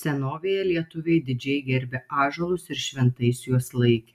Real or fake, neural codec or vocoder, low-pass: real; none; 14.4 kHz